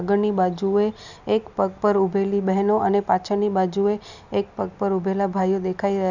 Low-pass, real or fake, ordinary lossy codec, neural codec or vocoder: 7.2 kHz; real; none; none